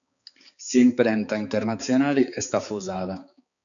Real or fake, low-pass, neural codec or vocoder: fake; 7.2 kHz; codec, 16 kHz, 4 kbps, X-Codec, HuBERT features, trained on balanced general audio